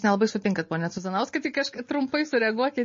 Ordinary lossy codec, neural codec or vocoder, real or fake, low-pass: MP3, 32 kbps; none; real; 7.2 kHz